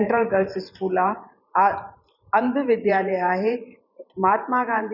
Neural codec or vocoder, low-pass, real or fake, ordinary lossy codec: vocoder, 44.1 kHz, 128 mel bands every 512 samples, BigVGAN v2; 5.4 kHz; fake; none